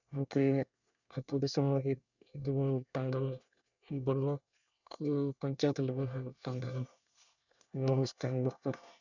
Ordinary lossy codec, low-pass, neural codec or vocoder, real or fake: none; 7.2 kHz; codec, 24 kHz, 1 kbps, SNAC; fake